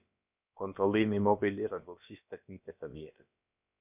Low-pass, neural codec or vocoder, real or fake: 3.6 kHz; codec, 16 kHz, about 1 kbps, DyCAST, with the encoder's durations; fake